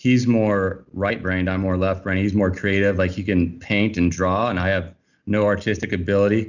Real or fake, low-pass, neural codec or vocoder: real; 7.2 kHz; none